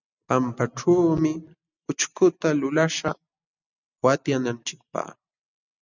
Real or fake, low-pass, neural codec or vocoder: real; 7.2 kHz; none